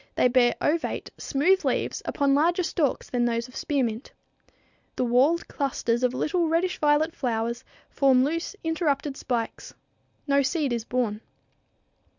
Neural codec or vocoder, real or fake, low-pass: none; real; 7.2 kHz